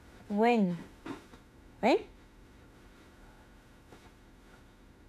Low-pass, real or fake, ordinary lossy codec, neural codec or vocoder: 14.4 kHz; fake; none; autoencoder, 48 kHz, 32 numbers a frame, DAC-VAE, trained on Japanese speech